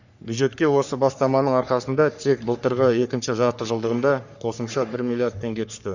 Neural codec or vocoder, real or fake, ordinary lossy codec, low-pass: codec, 44.1 kHz, 3.4 kbps, Pupu-Codec; fake; none; 7.2 kHz